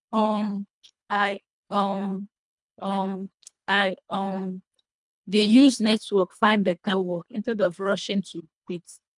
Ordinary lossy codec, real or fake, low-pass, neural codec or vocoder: MP3, 96 kbps; fake; 10.8 kHz; codec, 24 kHz, 1.5 kbps, HILCodec